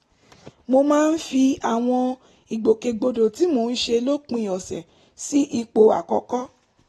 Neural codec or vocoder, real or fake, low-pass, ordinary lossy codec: none; real; 19.8 kHz; AAC, 32 kbps